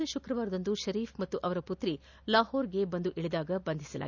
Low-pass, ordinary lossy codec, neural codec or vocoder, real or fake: 7.2 kHz; none; none; real